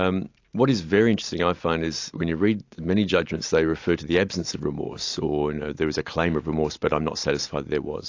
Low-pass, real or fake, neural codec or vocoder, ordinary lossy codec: 7.2 kHz; real; none; AAC, 48 kbps